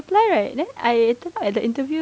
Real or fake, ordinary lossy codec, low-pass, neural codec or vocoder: real; none; none; none